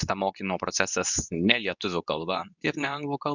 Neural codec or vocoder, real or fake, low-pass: codec, 24 kHz, 0.9 kbps, WavTokenizer, medium speech release version 2; fake; 7.2 kHz